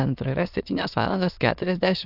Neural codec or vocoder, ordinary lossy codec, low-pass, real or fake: autoencoder, 22.05 kHz, a latent of 192 numbers a frame, VITS, trained on many speakers; AAC, 48 kbps; 5.4 kHz; fake